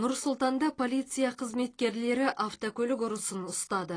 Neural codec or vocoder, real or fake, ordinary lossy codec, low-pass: none; real; AAC, 32 kbps; 9.9 kHz